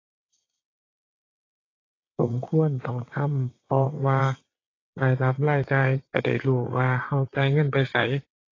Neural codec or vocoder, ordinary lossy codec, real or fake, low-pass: vocoder, 22.05 kHz, 80 mel bands, WaveNeXt; AAC, 32 kbps; fake; 7.2 kHz